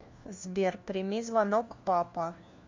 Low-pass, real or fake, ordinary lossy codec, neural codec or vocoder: 7.2 kHz; fake; MP3, 48 kbps; codec, 16 kHz, 1 kbps, FunCodec, trained on LibriTTS, 50 frames a second